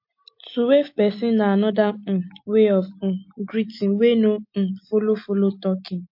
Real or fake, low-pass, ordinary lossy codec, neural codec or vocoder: real; 5.4 kHz; MP3, 32 kbps; none